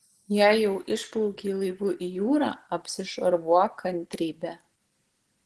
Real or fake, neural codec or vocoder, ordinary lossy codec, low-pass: real; none; Opus, 16 kbps; 10.8 kHz